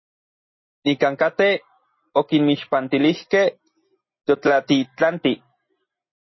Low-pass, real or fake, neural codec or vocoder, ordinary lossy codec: 7.2 kHz; real; none; MP3, 24 kbps